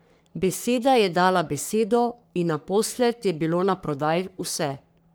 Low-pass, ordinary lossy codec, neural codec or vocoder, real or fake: none; none; codec, 44.1 kHz, 3.4 kbps, Pupu-Codec; fake